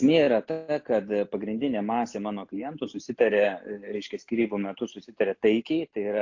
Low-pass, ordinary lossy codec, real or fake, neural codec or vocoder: 7.2 kHz; AAC, 48 kbps; real; none